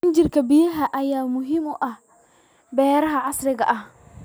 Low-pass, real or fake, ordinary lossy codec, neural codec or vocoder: none; real; none; none